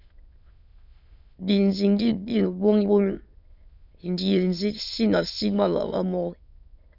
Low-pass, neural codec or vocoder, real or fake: 5.4 kHz; autoencoder, 22.05 kHz, a latent of 192 numbers a frame, VITS, trained on many speakers; fake